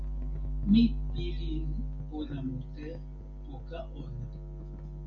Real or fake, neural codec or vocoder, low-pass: real; none; 7.2 kHz